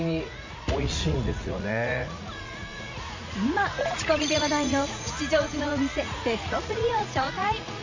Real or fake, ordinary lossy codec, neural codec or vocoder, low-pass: fake; MP3, 48 kbps; vocoder, 44.1 kHz, 80 mel bands, Vocos; 7.2 kHz